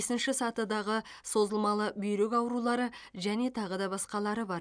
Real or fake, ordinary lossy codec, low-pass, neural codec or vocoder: real; none; 9.9 kHz; none